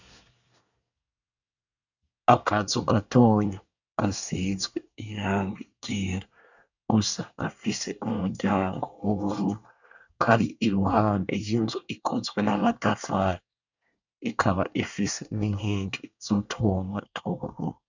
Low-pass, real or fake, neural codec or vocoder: 7.2 kHz; fake; codec, 24 kHz, 1 kbps, SNAC